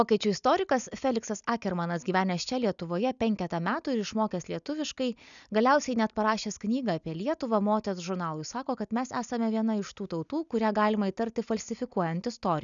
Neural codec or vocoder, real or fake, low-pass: none; real; 7.2 kHz